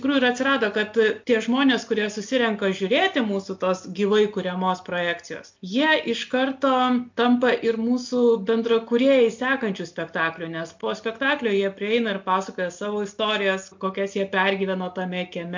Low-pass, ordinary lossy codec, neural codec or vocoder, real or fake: 7.2 kHz; MP3, 48 kbps; none; real